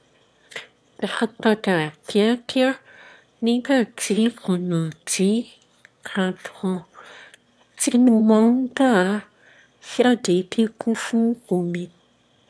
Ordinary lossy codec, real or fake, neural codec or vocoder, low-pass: none; fake; autoencoder, 22.05 kHz, a latent of 192 numbers a frame, VITS, trained on one speaker; none